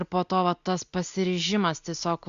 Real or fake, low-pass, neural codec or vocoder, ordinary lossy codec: real; 7.2 kHz; none; Opus, 64 kbps